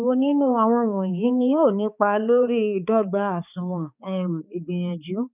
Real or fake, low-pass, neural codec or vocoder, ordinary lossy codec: fake; 3.6 kHz; codec, 16 kHz, 4 kbps, X-Codec, HuBERT features, trained on balanced general audio; none